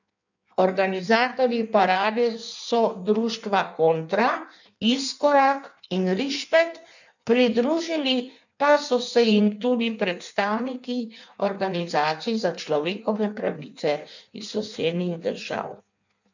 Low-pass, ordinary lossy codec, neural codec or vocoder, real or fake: 7.2 kHz; none; codec, 16 kHz in and 24 kHz out, 1.1 kbps, FireRedTTS-2 codec; fake